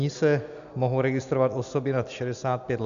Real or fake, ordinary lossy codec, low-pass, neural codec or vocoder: real; MP3, 96 kbps; 7.2 kHz; none